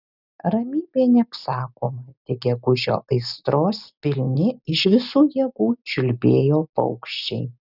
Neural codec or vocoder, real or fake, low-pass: none; real; 5.4 kHz